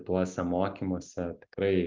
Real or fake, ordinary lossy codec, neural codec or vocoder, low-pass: real; Opus, 24 kbps; none; 7.2 kHz